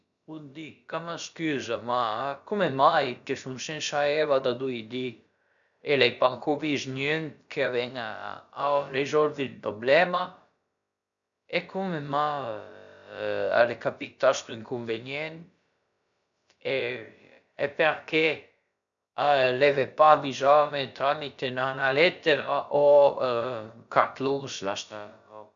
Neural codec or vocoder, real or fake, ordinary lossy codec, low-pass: codec, 16 kHz, about 1 kbps, DyCAST, with the encoder's durations; fake; none; 7.2 kHz